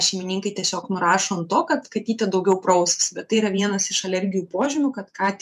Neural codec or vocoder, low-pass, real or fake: vocoder, 48 kHz, 128 mel bands, Vocos; 14.4 kHz; fake